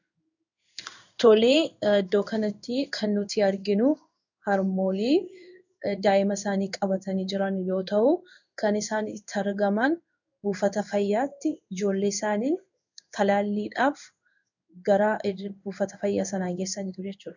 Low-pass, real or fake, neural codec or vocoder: 7.2 kHz; fake; codec, 16 kHz in and 24 kHz out, 1 kbps, XY-Tokenizer